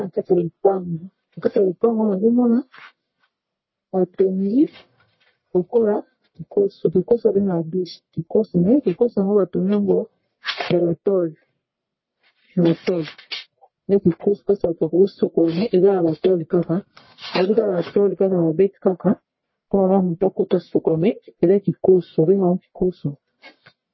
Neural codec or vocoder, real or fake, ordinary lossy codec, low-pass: codec, 44.1 kHz, 1.7 kbps, Pupu-Codec; fake; MP3, 24 kbps; 7.2 kHz